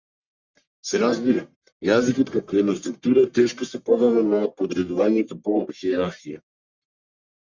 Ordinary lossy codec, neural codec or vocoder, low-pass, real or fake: Opus, 64 kbps; codec, 44.1 kHz, 1.7 kbps, Pupu-Codec; 7.2 kHz; fake